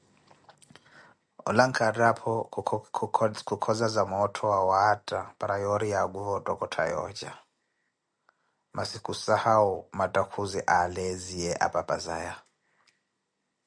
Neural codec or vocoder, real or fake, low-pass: none; real; 9.9 kHz